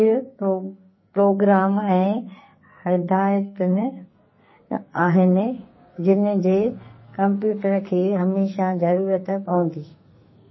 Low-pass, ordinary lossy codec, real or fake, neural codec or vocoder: 7.2 kHz; MP3, 24 kbps; fake; codec, 44.1 kHz, 2.6 kbps, SNAC